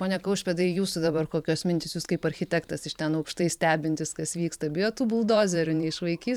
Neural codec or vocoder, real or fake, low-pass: vocoder, 44.1 kHz, 128 mel bands every 512 samples, BigVGAN v2; fake; 19.8 kHz